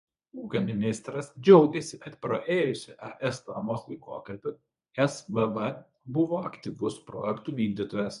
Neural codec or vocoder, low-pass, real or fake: codec, 24 kHz, 0.9 kbps, WavTokenizer, medium speech release version 1; 10.8 kHz; fake